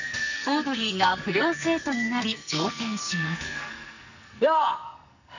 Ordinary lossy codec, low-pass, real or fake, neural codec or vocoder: none; 7.2 kHz; fake; codec, 44.1 kHz, 2.6 kbps, SNAC